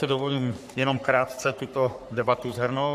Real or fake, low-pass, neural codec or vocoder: fake; 14.4 kHz; codec, 44.1 kHz, 3.4 kbps, Pupu-Codec